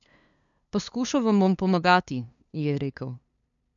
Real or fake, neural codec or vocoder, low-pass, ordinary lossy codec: fake; codec, 16 kHz, 2 kbps, FunCodec, trained on LibriTTS, 25 frames a second; 7.2 kHz; none